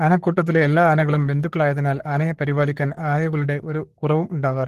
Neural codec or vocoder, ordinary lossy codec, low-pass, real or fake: codec, 44.1 kHz, 3.4 kbps, Pupu-Codec; Opus, 16 kbps; 14.4 kHz; fake